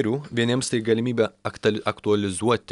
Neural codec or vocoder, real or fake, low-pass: none; real; 10.8 kHz